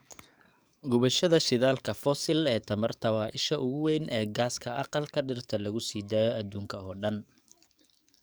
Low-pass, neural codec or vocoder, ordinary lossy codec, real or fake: none; codec, 44.1 kHz, 7.8 kbps, DAC; none; fake